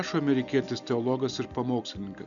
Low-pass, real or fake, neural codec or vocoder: 7.2 kHz; real; none